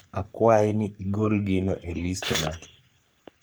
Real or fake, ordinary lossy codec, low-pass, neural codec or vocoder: fake; none; none; codec, 44.1 kHz, 3.4 kbps, Pupu-Codec